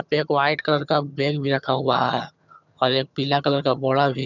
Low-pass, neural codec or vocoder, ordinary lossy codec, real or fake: 7.2 kHz; vocoder, 22.05 kHz, 80 mel bands, HiFi-GAN; none; fake